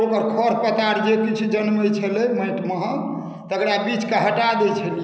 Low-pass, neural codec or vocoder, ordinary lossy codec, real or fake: none; none; none; real